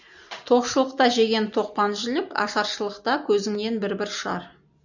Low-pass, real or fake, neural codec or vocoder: 7.2 kHz; real; none